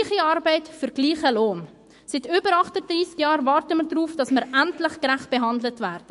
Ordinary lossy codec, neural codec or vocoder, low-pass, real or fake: MP3, 48 kbps; autoencoder, 48 kHz, 128 numbers a frame, DAC-VAE, trained on Japanese speech; 14.4 kHz; fake